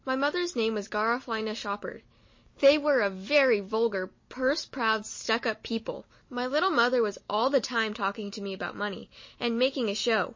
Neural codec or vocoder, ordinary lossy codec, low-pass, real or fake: none; MP3, 32 kbps; 7.2 kHz; real